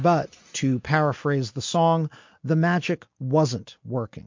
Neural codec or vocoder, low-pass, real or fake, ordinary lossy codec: none; 7.2 kHz; real; MP3, 48 kbps